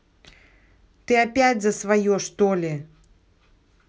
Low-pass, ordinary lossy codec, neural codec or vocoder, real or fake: none; none; none; real